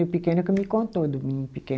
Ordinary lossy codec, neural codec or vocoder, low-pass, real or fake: none; none; none; real